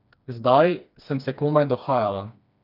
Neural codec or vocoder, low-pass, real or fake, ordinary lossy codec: codec, 16 kHz, 2 kbps, FreqCodec, smaller model; 5.4 kHz; fake; none